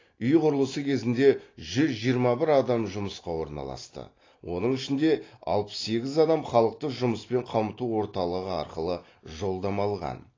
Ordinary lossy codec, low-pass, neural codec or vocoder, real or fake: AAC, 32 kbps; 7.2 kHz; none; real